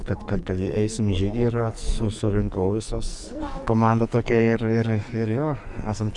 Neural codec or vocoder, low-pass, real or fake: codec, 44.1 kHz, 2.6 kbps, SNAC; 10.8 kHz; fake